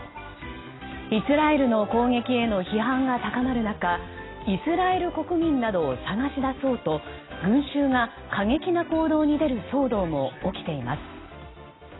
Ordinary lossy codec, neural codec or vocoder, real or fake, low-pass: AAC, 16 kbps; none; real; 7.2 kHz